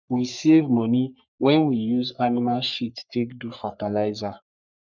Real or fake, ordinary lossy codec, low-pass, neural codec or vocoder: fake; none; 7.2 kHz; codec, 44.1 kHz, 3.4 kbps, Pupu-Codec